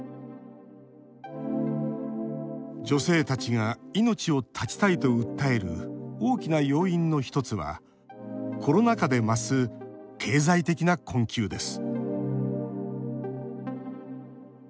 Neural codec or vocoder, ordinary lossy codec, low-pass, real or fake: none; none; none; real